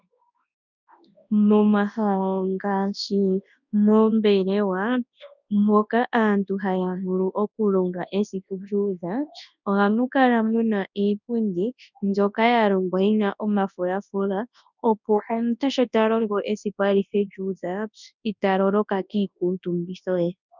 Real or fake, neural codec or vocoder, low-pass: fake; codec, 24 kHz, 0.9 kbps, WavTokenizer, large speech release; 7.2 kHz